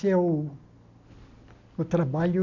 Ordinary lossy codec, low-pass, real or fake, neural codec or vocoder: none; 7.2 kHz; real; none